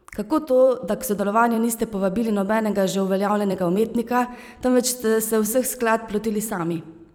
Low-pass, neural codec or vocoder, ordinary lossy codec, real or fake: none; vocoder, 44.1 kHz, 128 mel bands every 256 samples, BigVGAN v2; none; fake